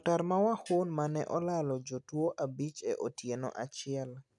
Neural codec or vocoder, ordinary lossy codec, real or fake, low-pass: none; none; real; 10.8 kHz